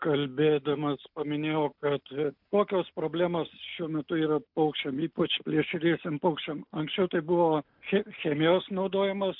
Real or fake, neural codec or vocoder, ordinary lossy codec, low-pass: real; none; MP3, 48 kbps; 5.4 kHz